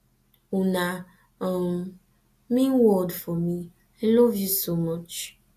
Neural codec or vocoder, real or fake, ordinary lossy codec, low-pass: none; real; AAC, 64 kbps; 14.4 kHz